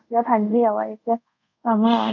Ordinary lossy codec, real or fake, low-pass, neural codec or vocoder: none; fake; 7.2 kHz; codec, 24 kHz, 0.5 kbps, DualCodec